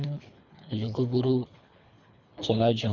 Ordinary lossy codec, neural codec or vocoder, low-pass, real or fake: none; codec, 24 kHz, 3 kbps, HILCodec; 7.2 kHz; fake